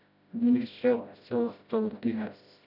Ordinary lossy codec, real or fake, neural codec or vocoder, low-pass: none; fake; codec, 16 kHz, 0.5 kbps, FreqCodec, smaller model; 5.4 kHz